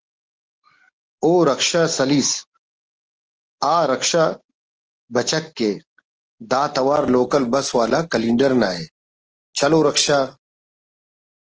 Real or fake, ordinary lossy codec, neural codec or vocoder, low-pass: real; Opus, 16 kbps; none; 7.2 kHz